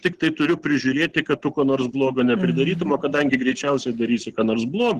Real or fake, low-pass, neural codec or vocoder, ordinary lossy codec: real; 14.4 kHz; none; Opus, 16 kbps